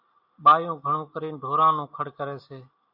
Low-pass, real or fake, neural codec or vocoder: 5.4 kHz; real; none